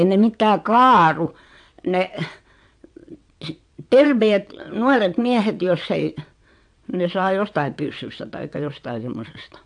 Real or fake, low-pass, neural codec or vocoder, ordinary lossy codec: fake; 9.9 kHz; vocoder, 22.05 kHz, 80 mel bands, WaveNeXt; AAC, 64 kbps